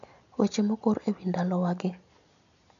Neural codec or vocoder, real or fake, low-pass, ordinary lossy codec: none; real; 7.2 kHz; none